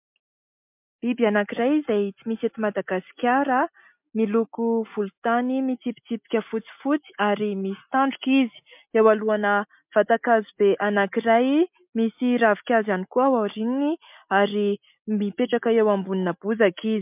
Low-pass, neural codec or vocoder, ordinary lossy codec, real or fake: 3.6 kHz; none; MP3, 32 kbps; real